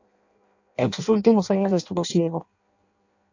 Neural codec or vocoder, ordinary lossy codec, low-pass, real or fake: codec, 16 kHz in and 24 kHz out, 0.6 kbps, FireRedTTS-2 codec; AAC, 48 kbps; 7.2 kHz; fake